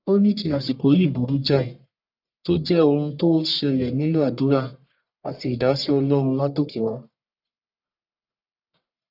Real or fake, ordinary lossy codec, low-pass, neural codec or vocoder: fake; none; 5.4 kHz; codec, 44.1 kHz, 1.7 kbps, Pupu-Codec